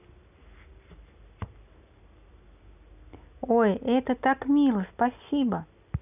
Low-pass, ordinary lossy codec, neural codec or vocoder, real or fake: 3.6 kHz; none; none; real